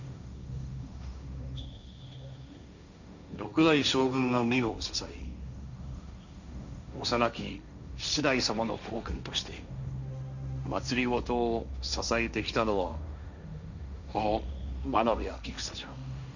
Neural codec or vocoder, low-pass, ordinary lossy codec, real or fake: codec, 16 kHz, 1.1 kbps, Voila-Tokenizer; 7.2 kHz; none; fake